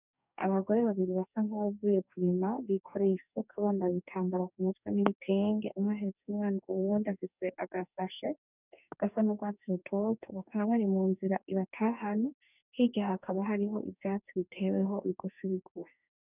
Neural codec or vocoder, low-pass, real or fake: codec, 44.1 kHz, 2.6 kbps, DAC; 3.6 kHz; fake